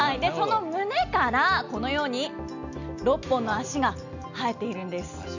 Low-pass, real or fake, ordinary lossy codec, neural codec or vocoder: 7.2 kHz; real; none; none